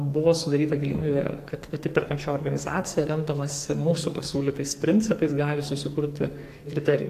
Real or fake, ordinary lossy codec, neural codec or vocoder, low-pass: fake; AAC, 64 kbps; codec, 44.1 kHz, 2.6 kbps, SNAC; 14.4 kHz